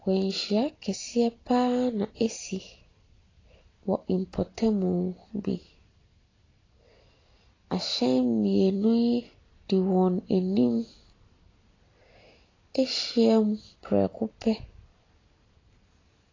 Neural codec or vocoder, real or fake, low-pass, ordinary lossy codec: none; real; 7.2 kHz; AAC, 32 kbps